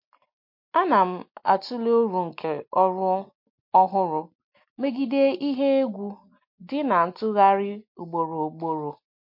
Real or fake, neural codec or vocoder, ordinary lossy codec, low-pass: real; none; MP3, 32 kbps; 5.4 kHz